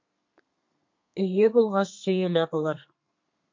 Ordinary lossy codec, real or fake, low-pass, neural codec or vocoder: MP3, 48 kbps; fake; 7.2 kHz; codec, 32 kHz, 1.9 kbps, SNAC